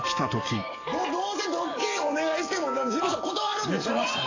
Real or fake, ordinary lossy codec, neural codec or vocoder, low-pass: fake; AAC, 32 kbps; codec, 44.1 kHz, 7.8 kbps, DAC; 7.2 kHz